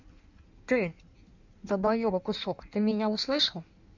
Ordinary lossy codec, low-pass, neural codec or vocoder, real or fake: none; 7.2 kHz; codec, 16 kHz in and 24 kHz out, 1.1 kbps, FireRedTTS-2 codec; fake